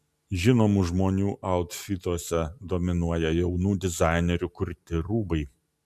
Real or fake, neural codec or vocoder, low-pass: real; none; 14.4 kHz